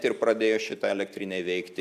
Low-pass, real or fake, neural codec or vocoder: 14.4 kHz; real; none